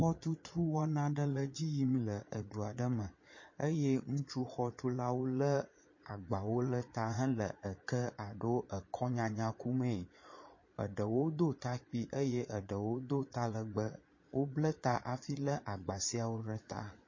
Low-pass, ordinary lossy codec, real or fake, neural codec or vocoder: 7.2 kHz; MP3, 32 kbps; fake; vocoder, 22.05 kHz, 80 mel bands, Vocos